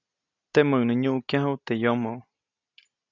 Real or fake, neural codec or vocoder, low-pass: real; none; 7.2 kHz